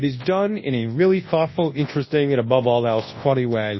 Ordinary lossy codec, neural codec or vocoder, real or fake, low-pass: MP3, 24 kbps; codec, 24 kHz, 0.9 kbps, WavTokenizer, large speech release; fake; 7.2 kHz